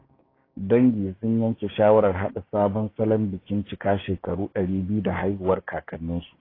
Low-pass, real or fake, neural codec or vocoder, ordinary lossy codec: 5.4 kHz; fake; codec, 16 kHz, 6 kbps, DAC; AAC, 24 kbps